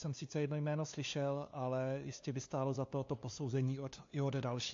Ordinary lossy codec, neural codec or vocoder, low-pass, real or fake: AAC, 48 kbps; codec, 16 kHz, 2 kbps, FunCodec, trained on LibriTTS, 25 frames a second; 7.2 kHz; fake